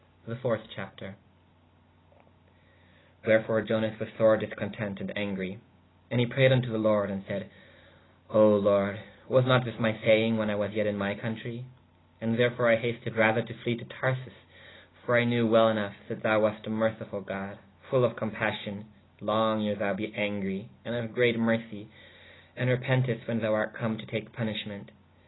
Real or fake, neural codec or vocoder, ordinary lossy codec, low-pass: real; none; AAC, 16 kbps; 7.2 kHz